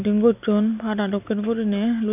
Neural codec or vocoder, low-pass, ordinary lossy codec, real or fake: none; 3.6 kHz; none; real